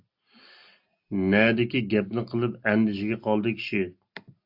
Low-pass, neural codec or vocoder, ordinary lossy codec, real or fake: 5.4 kHz; none; AAC, 48 kbps; real